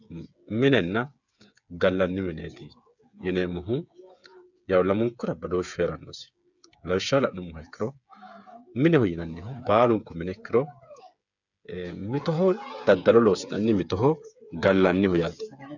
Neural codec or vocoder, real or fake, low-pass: codec, 16 kHz, 8 kbps, FreqCodec, smaller model; fake; 7.2 kHz